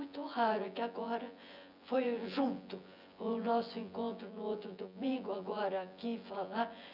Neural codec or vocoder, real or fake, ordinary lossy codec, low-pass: vocoder, 24 kHz, 100 mel bands, Vocos; fake; none; 5.4 kHz